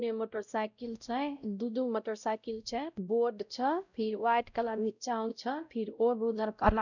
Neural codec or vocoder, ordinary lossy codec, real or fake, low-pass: codec, 16 kHz, 0.5 kbps, X-Codec, WavLM features, trained on Multilingual LibriSpeech; none; fake; 7.2 kHz